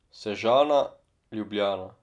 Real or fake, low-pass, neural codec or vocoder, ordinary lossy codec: real; 10.8 kHz; none; none